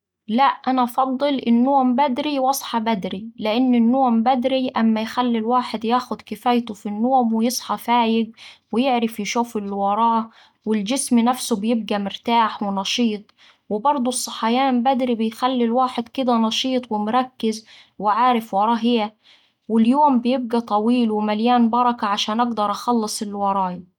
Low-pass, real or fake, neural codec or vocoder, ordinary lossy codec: 19.8 kHz; real; none; none